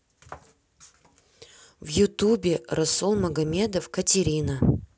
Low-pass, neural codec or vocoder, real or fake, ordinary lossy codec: none; none; real; none